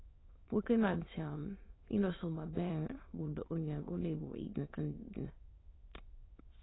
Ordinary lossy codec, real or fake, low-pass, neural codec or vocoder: AAC, 16 kbps; fake; 7.2 kHz; autoencoder, 22.05 kHz, a latent of 192 numbers a frame, VITS, trained on many speakers